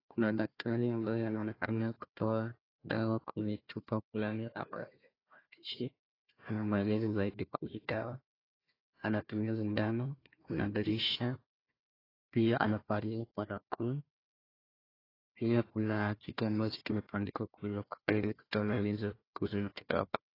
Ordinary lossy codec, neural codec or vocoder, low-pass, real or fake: AAC, 24 kbps; codec, 16 kHz, 1 kbps, FunCodec, trained on Chinese and English, 50 frames a second; 5.4 kHz; fake